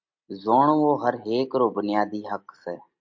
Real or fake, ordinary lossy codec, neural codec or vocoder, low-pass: real; MP3, 48 kbps; none; 7.2 kHz